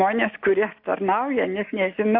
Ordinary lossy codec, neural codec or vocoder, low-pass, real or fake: MP3, 48 kbps; none; 5.4 kHz; real